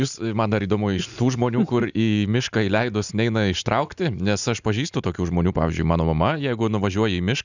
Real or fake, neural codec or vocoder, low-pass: real; none; 7.2 kHz